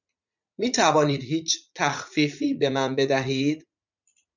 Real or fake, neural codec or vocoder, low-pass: fake; vocoder, 44.1 kHz, 80 mel bands, Vocos; 7.2 kHz